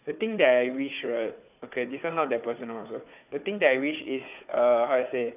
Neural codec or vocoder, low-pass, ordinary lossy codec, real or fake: codec, 16 kHz, 4 kbps, FunCodec, trained on Chinese and English, 50 frames a second; 3.6 kHz; AAC, 32 kbps; fake